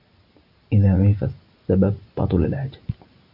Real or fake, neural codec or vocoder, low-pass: real; none; 5.4 kHz